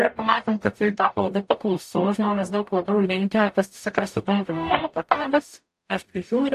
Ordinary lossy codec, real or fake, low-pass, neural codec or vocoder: AAC, 64 kbps; fake; 14.4 kHz; codec, 44.1 kHz, 0.9 kbps, DAC